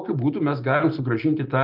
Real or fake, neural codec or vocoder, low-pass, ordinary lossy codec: real; none; 5.4 kHz; Opus, 24 kbps